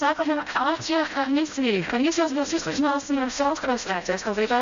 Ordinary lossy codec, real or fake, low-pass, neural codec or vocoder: Opus, 64 kbps; fake; 7.2 kHz; codec, 16 kHz, 0.5 kbps, FreqCodec, smaller model